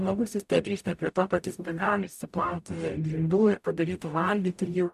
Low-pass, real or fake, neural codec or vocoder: 14.4 kHz; fake; codec, 44.1 kHz, 0.9 kbps, DAC